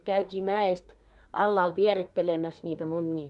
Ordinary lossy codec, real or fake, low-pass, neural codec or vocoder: none; fake; 10.8 kHz; codec, 24 kHz, 1 kbps, SNAC